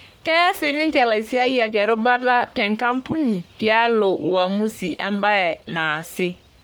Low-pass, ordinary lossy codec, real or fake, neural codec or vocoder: none; none; fake; codec, 44.1 kHz, 1.7 kbps, Pupu-Codec